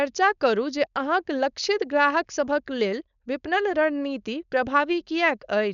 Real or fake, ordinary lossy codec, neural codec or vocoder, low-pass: fake; none; codec, 16 kHz, 4.8 kbps, FACodec; 7.2 kHz